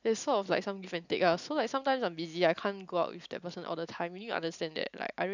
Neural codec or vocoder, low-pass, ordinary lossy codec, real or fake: none; 7.2 kHz; none; real